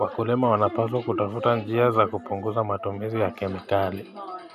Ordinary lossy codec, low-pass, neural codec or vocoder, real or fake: none; 14.4 kHz; none; real